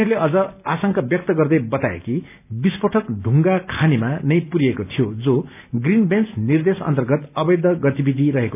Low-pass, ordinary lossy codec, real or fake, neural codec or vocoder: 3.6 kHz; none; real; none